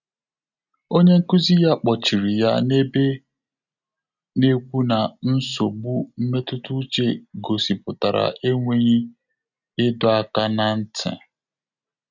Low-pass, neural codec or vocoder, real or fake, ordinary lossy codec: 7.2 kHz; none; real; none